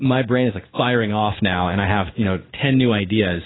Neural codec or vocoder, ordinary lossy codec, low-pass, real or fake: codec, 16 kHz in and 24 kHz out, 1 kbps, XY-Tokenizer; AAC, 16 kbps; 7.2 kHz; fake